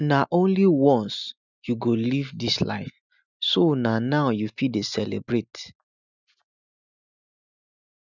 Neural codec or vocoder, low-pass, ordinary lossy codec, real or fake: none; 7.2 kHz; none; real